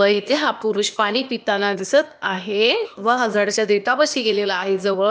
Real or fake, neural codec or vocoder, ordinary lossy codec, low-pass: fake; codec, 16 kHz, 0.8 kbps, ZipCodec; none; none